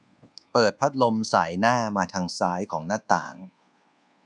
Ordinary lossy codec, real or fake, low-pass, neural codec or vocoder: none; fake; 10.8 kHz; codec, 24 kHz, 1.2 kbps, DualCodec